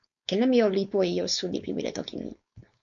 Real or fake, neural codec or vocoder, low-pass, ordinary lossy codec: fake; codec, 16 kHz, 4.8 kbps, FACodec; 7.2 kHz; MP3, 64 kbps